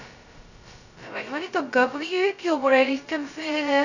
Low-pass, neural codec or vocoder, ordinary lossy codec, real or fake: 7.2 kHz; codec, 16 kHz, 0.2 kbps, FocalCodec; none; fake